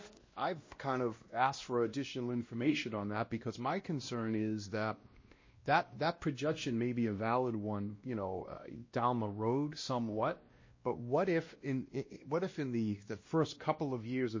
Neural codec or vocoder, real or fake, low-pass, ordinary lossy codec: codec, 16 kHz, 1 kbps, X-Codec, WavLM features, trained on Multilingual LibriSpeech; fake; 7.2 kHz; MP3, 32 kbps